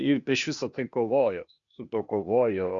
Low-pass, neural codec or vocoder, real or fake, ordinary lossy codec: 7.2 kHz; codec, 16 kHz, 0.8 kbps, ZipCodec; fake; Opus, 64 kbps